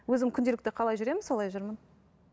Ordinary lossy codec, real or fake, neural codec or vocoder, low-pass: none; real; none; none